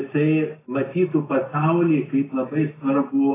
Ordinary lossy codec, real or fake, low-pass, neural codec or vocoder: AAC, 16 kbps; real; 3.6 kHz; none